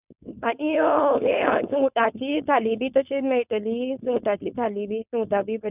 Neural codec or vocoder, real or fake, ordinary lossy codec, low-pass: codec, 16 kHz, 4.8 kbps, FACodec; fake; none; 3.6 kHz